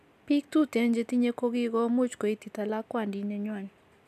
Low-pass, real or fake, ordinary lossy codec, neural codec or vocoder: 14.4 kHz; real; AAC, 96 kbps; none